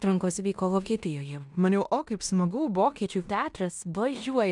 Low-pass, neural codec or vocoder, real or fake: 10.8 kHz; codec, 16 kHz in and 24 kHz out, 0.9 kbps, LongCat-Audio-Codec, fine tuned four codebook decoder; fake